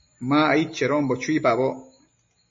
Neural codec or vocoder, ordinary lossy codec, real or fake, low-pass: none; MP3, 32 kbps; real; 7.2 kHz